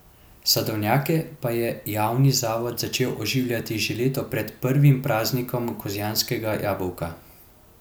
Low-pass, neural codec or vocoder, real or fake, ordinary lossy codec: none; none; real; none